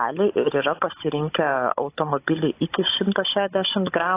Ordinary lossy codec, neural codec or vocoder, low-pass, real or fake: AAC, 24 kbps; codec, 16 kHz, 16 kbps, FunCodec, trained on Chinese and English, 50 frames a second; 3.6 kHz; fake